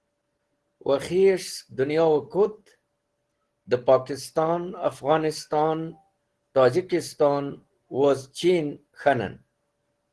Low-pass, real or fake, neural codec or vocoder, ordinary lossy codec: 10.8 kHz; real; none; Opus, 16 kbps